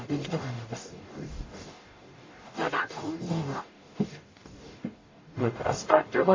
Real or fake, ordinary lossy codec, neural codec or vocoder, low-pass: fake; MP3, 32 kbps; codec, 44.1 kHz, 0.9 kbps, DAC; 7.2 kHz